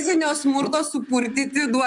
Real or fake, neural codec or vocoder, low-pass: fake; vocoder, 44.1 kHz, 128 mel bands, Pupu-Vocoder; 10.8 kHz